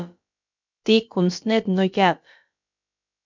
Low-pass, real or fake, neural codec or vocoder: 7.2 kHz; fake; codec, 16 kHz, about 1 kbps, DyCAST, with the encoder's durations